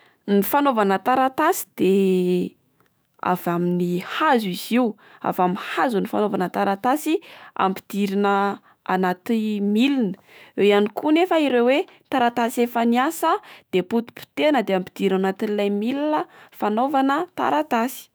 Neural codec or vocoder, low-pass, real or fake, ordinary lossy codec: autoencoder, 48 kHz, 128 numbers a frame, DAC-VAE, trained on Japanese speech; none; fake; none